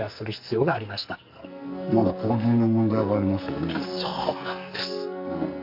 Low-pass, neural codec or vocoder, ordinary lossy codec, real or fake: 5.4 kHz; codec, 44.1 kHz, 2.6 kbps, SNAC; MP3, 48 kbps; fake